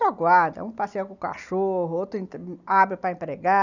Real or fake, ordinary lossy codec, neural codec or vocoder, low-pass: real; none; none; 7.2 kHz